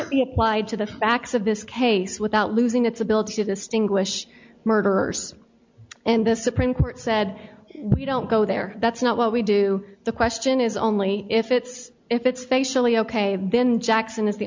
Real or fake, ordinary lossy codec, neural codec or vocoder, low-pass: real; MP3, 64 kbps; none; 7.2 kHz